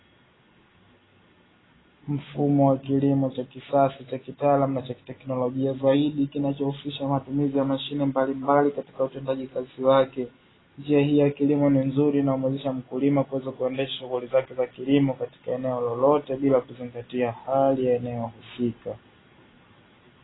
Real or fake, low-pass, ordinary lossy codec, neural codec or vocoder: real; 7.2 kHz; AAC, 16 kbps; none